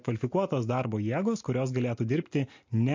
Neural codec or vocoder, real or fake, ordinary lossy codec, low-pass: none; real; MP3, 48 kbps; 7.2 kHz